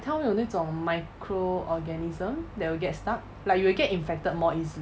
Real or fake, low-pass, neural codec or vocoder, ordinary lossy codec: real; none; none; none